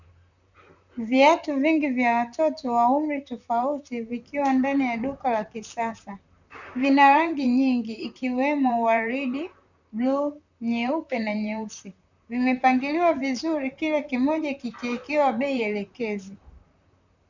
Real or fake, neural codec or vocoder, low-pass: fake; vocoder, 44.1 kHz, 128 mel bands, Pupu-Vocoder; 7.2 kHz